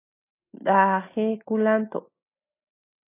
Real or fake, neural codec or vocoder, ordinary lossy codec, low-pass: real; none; AAC, 16 kbps; 3.6 kHz